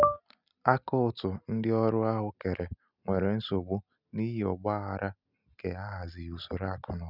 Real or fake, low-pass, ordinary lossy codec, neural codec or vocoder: real; 5.4 kHz; none; none